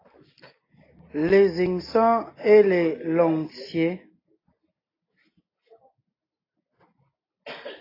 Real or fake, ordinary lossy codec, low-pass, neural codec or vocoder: real; AAC, 24 kbps; 5.4 kHz; none